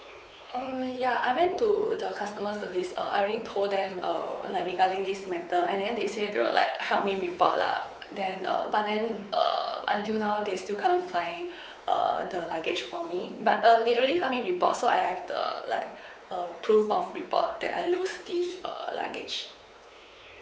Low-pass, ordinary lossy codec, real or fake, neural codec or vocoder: none; none; fake; codec, 16 kHz, 4 kbps, X-Codec, WavLM features, trained on Multilingual LibriSpeech